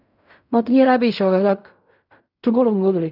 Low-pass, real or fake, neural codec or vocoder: 5.4 kHz; fake; codec, 16 kHz in and 24 kHz out, 0.4 kbps, LongCat-Audio-Codec, fine tuned four codebook decoder